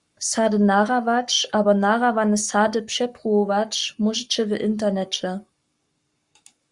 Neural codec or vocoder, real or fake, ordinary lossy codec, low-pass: codec, 44.1 kHz, 7.8 kbps, Pupu-Codec; fake; Opus, 64 kbps; 10.8 kHz